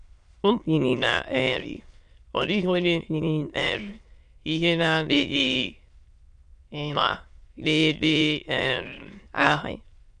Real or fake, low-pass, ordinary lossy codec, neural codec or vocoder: fake; 9.9 kHz; MP3, 64 kbps; autoencoder, 22.05 kHz, a latent of 192 numbers a frame, VITS, trained on many speakers